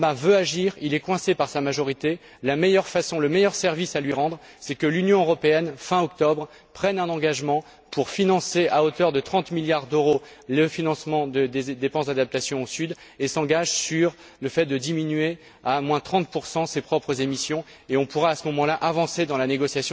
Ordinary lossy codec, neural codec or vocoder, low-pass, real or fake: none; none; none; real